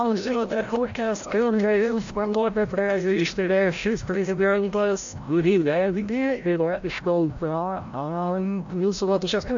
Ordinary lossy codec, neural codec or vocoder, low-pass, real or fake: AAC, 64 kbps; codec, 16 kHz, 0.5 kbps, FreqCodec, larger model; 7.2 kHz; fake